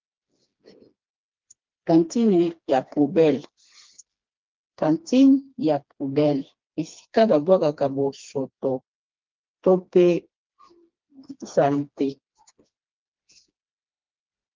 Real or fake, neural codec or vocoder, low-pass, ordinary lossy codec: fake; codec, 16 kHz, 2 kbps, FreqCodec, smaller model; 7.2 kHz; Opus, 32 kbps